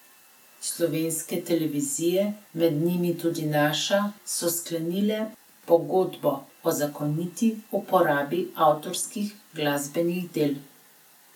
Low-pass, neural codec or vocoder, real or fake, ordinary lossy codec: 19.8 kHz; none; real; MP3, 96 kbps